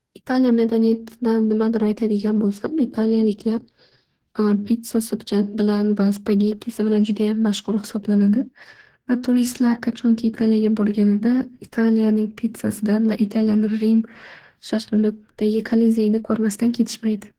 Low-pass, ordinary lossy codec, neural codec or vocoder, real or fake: 19.8 kHz; Opus, 16 kbps; codec, 44.1 kHz, 2.6 kbps, DAC; fake